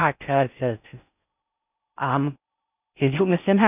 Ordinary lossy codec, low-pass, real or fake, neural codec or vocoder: none; 3.6 kHz; fake; codec, 16 kHz in and 24 kHz out, 0.6 kbps, FocalCodec, streaming, 4096 codes